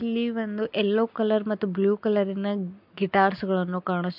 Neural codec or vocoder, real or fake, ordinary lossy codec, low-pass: none; real; MP3, 48 kbps; 5.4 kHz